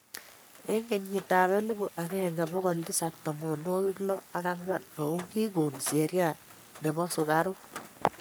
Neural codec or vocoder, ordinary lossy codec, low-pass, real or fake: codec, 44.1 kHz, 3.4 kbps, Pupu-Codec; none; none; fake